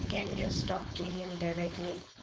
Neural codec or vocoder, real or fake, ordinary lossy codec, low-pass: codec, 16 kHz, 4.8 kbps, FACodec; fake; none; none